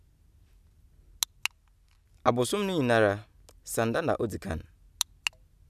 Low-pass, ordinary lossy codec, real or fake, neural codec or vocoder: 14.4 kHz; none; real; none